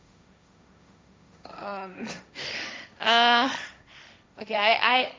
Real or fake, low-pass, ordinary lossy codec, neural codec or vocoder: fake; none; none; codec, 16 kHz, 1.1 kbps, Voila-Tokenizer